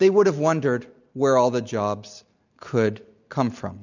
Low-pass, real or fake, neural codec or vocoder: 7.2 kHz; real; none